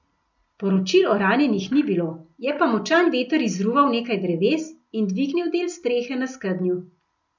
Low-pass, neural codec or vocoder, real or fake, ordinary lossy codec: 7.2 kHz; none; real; none